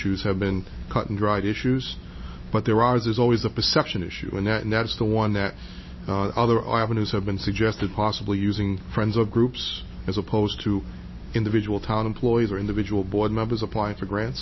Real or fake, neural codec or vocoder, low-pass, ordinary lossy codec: real; none; 7.2 kHz; MP3, 24 kbps